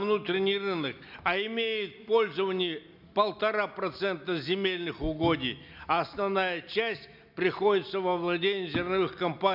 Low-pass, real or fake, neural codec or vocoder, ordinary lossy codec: 5.4 kHz; real; none; none